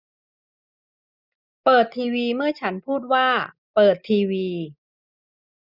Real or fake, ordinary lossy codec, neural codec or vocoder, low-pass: real; none; none; 5.4 kHz